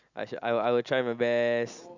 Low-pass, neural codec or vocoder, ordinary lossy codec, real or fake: 7.2 kHz; none; Opus, 64 kbps; real